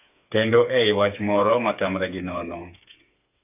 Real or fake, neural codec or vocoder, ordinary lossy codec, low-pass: fake; codec, 16 kHz, 4 kbps, FreqCodec, smaller model; none; 3.6 kHz